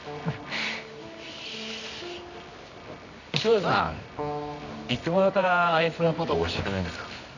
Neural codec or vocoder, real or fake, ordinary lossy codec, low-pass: codec, 24 kHz, 0.9 kbps, WavTokenizer, medium music audio release; fake; none; 7.2 kHz